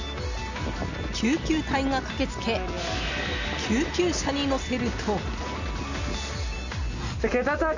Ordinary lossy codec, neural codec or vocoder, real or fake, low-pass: none; none; real; 7.2 kHz